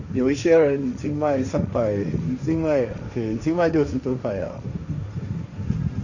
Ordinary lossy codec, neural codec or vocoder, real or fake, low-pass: none; codec, 16 kHz, 1.1 kbps, Voila-Tokenizer; fake; 7.2 kHz